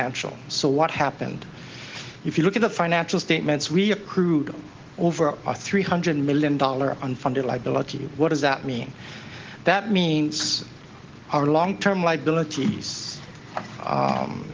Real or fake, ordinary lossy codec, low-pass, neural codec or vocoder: real; Opus, 16 kbps; 7.2 kHz; none